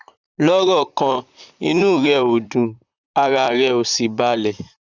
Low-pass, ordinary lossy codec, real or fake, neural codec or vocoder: 7.2 kHz; none; fake; vocoder, 44.1 kHz, 128 mel bands, Pupu-Vocoder